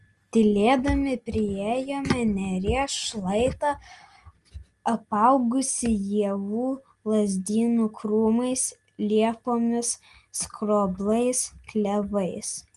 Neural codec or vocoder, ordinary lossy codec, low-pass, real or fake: none; Opus, 24 kbps; 10.8 kHz; real